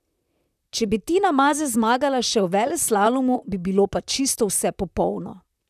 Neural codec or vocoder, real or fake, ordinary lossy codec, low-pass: vocoder, 44.1 kHz, 128 mel bands, Pupu-Vocoder; fake; none; 14.4 kHz